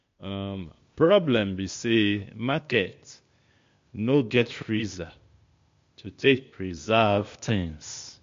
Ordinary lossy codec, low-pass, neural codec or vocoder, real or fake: MP3, 48 kbps; 7.2 kHz; codec, 16 kHz, 0.8 kbps, ZipCodec; fake